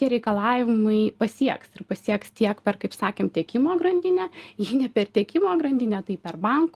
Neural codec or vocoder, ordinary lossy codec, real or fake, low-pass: none; Opus, 24 kbps; real; 14.4 kHz